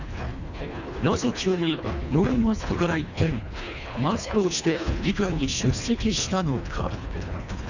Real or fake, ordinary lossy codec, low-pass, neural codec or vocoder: fake; none; 7.2 kHz; codec, 24 kHz, 1.5 kbps, HILCodec